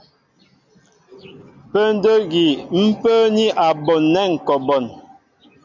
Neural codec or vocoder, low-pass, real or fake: none; 7.2 kHz; real